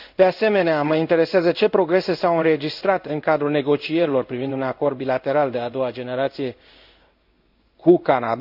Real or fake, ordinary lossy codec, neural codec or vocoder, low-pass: fake; none; codec, 16 kHz in and 24 kHz out, 1 kbps, XY-Tokenizer; 5.4 kHz